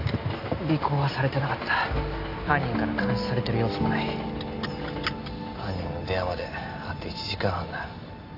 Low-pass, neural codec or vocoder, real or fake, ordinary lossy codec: 5.4 kHz; none; real; AAC, 32 kbps